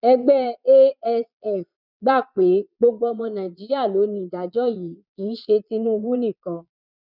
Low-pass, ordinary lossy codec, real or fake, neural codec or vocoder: 5.4 kHz; none; fake; vocoder, 22.05 kHz, 80 mel bands, Vocos